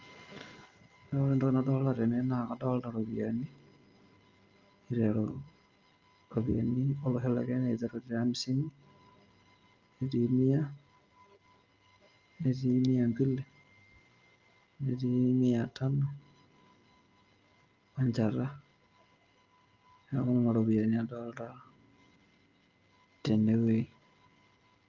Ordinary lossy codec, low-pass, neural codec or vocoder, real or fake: Opus, 24 kbps; 7.2 kHz; none; real